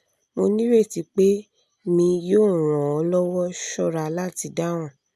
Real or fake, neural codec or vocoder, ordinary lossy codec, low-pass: real; none; none; 14.4 kHz